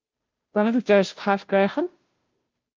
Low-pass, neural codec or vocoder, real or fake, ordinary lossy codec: 7.2 kHz; codec, 16 kHz, 0.5 kbps, FunCodec, trained on Chinese and English, 25 frames a second; fake; Opus, 24 kbps